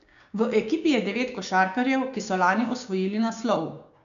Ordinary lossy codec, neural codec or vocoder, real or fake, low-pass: none; codec, 16 kHz, 6 kbps, DAC; fake; 7.2 kHz